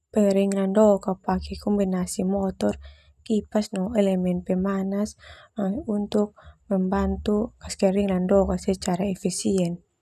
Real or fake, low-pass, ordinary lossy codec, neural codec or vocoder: real; 14.4 kHz; none; none